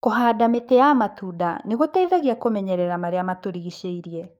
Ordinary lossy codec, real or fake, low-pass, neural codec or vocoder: none; fake; 19.8 kHz; codec, 44.1 kHz, 7.8 kbps, DAC